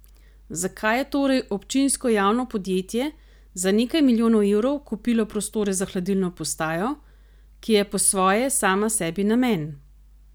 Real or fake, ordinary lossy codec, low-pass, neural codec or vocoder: real; none; none; none